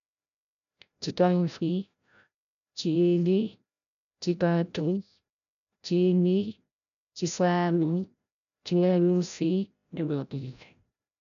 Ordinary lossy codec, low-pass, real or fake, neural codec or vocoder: none; 7.2 kHz; fake; codec, 16 kHz, 0.5 kbps, FreqCodec, larger model